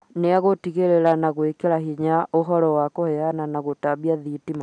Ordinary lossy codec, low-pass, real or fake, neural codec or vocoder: none; 9.9 kHz; real; none